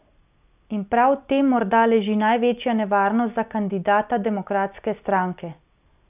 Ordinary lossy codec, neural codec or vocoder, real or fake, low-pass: none; none; real; 3.6 kHz